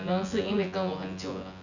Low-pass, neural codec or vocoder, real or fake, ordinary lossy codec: 7.2 kHz; vocoder, 24 kHz, 100 mel bands, Vocos; fake; none